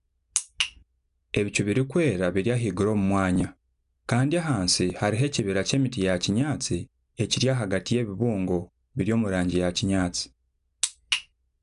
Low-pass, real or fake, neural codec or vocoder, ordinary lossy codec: 10.8 kHz; real; none; none